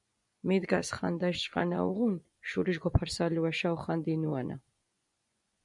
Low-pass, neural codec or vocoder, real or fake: 10.8 kHz; vocoder, 24 kHz, 100 mel bands, Vocos; fake